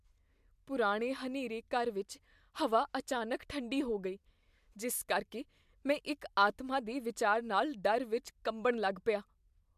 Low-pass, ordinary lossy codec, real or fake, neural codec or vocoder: 14.4 kHz; MP3, 96 kbps; real; none